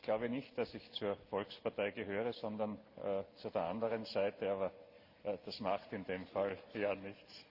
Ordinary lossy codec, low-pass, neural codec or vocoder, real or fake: Opus, 32 kbps; 5.4 kHz; none; real